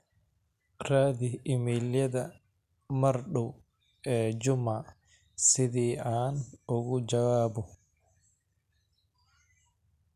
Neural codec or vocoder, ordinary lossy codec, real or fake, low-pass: none; none; real; 14.4 kHz